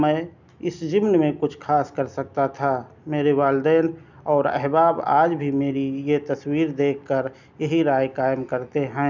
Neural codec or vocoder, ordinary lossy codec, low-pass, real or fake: none; none; 7.2 kHz; real